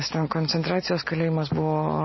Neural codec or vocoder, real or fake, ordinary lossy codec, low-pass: none; real; MP3, 24 kbps; 7.2 kHz